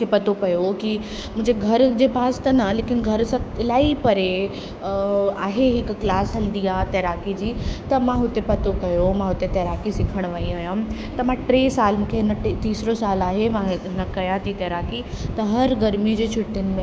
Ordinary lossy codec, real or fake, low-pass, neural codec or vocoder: none; fake; none; codec, 16 kHz, 6 kbps, DAC